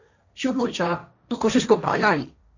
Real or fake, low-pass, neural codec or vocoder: fake; 7.2 kHz; codec, 16 kHz, 1.1 kbps, Voila-Tokenizer